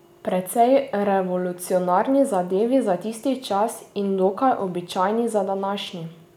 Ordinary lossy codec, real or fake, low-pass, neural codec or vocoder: none; real; 19.8 kHz; none